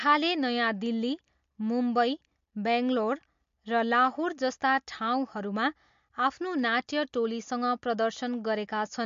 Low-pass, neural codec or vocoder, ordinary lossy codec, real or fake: 7.2 kHz; none; MP3, 48 kbps; real